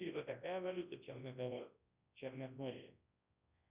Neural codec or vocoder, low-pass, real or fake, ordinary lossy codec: codec, 24 kHz, 0.9 kbps, WavTokenizer, large speech release; 3.6 kHz; fake; Opus, 64 kbps